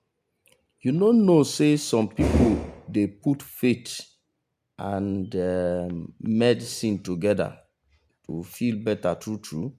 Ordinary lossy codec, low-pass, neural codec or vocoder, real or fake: MP3, 96 kbps; 14.4 kHz; none; real